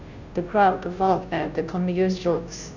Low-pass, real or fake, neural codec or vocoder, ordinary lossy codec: 7.2 kHz; fake; codec, 16 kHz, 0.5 kbps, FunCodec, trained on Chinese and English, 25 frames a second; none